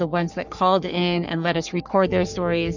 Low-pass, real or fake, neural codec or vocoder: 7.2 kHz; fake; codec, 44.1 kHz, 3.4 kbps, Pupu-Codec